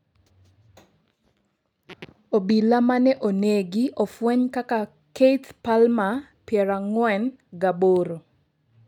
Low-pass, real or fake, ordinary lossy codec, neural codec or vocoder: 19.8 kHz; real; none; none